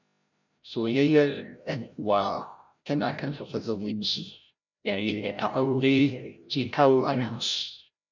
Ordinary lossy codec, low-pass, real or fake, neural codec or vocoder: none; 7.2 kHz; fake; codec, 16 kHz, 0.5 kbps, FreqCodec, larger model